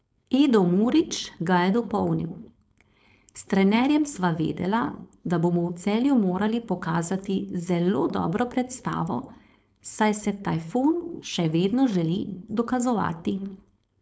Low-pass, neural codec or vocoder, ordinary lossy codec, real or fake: none; codec, 16 kHz, 4.8 kbps, FACodec; none; fake